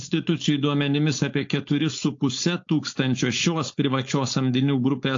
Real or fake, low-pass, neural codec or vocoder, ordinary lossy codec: fake; 7.2 kHz; codec, 16 kHz, 4.8 kbps, FACodec; AAC, 48 kbps